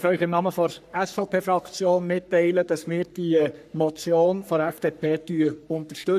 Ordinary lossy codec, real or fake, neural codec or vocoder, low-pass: none; fake; codec, 44.1 kHz, 3.4 kbps, Pupu-Codec; 14.4 kHz